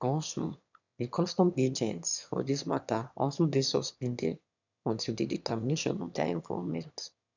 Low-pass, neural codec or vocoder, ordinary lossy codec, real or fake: 7.2 kHz; autoencoder, 22.05 kHz, a latent of 192 numbers a frame, VITS, trained on one speaker; none; fake